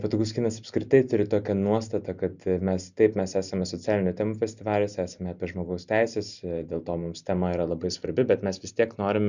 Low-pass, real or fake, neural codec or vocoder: 7.2 kHz; real; none